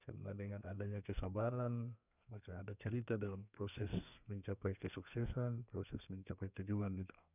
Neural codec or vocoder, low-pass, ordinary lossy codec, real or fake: codec, 32 kHz, 1.9 kbps, SNAC; 3.6 kHz; none; fake